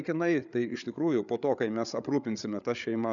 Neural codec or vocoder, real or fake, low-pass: codec, 16 kHz, 8 kbps, FreqCodec, larger model; fake; 7.2 kHz